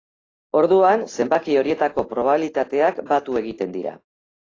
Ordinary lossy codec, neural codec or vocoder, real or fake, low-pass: AAC, 32 kbps; none; real; 7.2 kHz